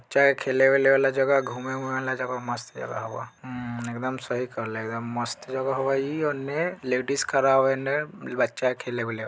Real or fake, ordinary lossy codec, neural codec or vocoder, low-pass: real; none; none; none